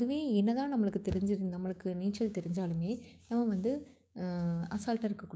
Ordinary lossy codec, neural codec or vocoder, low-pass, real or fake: none; codec, 16 kHz, 6 kbps, DAC; none; fake